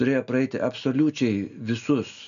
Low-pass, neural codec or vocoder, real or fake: 7.2 kHz; none; real